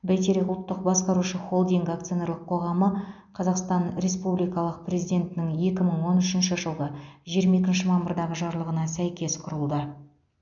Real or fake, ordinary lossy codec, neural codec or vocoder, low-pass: real; none; none; 7.2 kHz